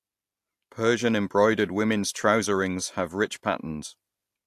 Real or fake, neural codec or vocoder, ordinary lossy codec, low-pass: real; none; AAC, 64 kbps; 14.4 kHz